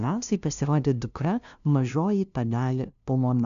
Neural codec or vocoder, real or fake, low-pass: codec, 16 kHz, 0.5 kbps, FunCodec, trained on LibriTTS, 25 frames a second; fake; 7.2 kHz